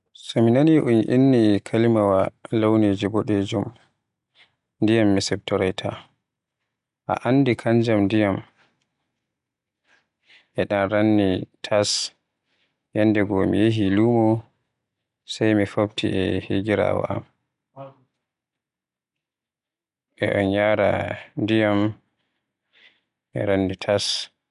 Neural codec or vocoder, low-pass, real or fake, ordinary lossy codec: none; 10.8 kHz; real; none